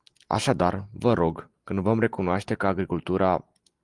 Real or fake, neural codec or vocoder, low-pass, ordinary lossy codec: real; none; 10.8 kHz; Opus, 24 kbps